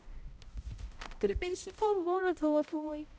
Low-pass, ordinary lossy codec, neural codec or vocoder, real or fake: none; none; codec, 16 kHz, 0.5 kbps, X-Codec, HuBERT features, trained on balanced general audio; fake